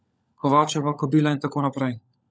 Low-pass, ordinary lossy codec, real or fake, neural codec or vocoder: none; none; fake; codec, 16 kHz, 16 kbps, FunCodec, trained on LibriTTS, 50 frames a second